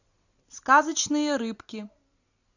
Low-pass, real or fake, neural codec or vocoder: 7.2 kHz; real; none